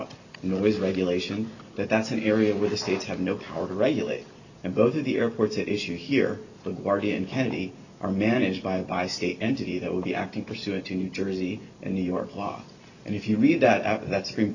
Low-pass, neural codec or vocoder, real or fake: 7.2 kHz; none; real